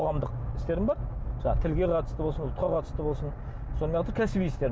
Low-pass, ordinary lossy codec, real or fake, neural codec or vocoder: none; none; real; none